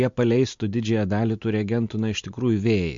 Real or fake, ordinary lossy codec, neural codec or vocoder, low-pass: real; MP3, 48 kbps; none; 7.2 kHz